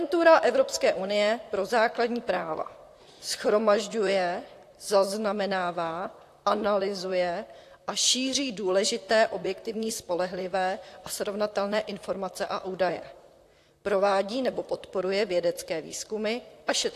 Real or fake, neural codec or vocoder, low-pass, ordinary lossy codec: fake; vocoder, 44.1 kHz, 128 mel bands, Pupu-Vocoder; 14.4 kHz; AAC, 64 kbps